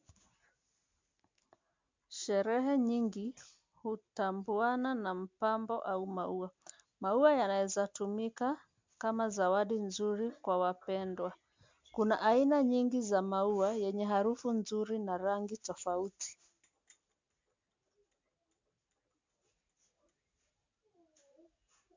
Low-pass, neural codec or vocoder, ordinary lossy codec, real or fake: 7.2 kHz; none; MP3, 64 kbps; real